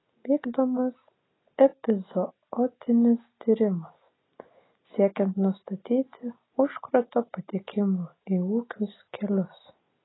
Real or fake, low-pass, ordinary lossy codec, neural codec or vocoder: real; 7.2 kHz; AAC, 16 kbps; none